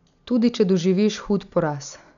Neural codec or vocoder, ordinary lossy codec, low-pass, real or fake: none; none; 7.2 kHz; real